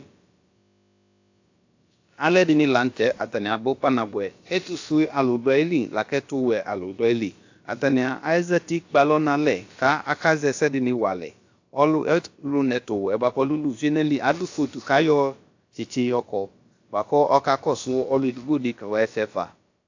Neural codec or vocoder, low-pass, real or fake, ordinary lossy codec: codec, 16 kHz, about 1 kbps, DyCAST, with the encoder's durations; 7.2 kHz; fake; AAC, 48 kbps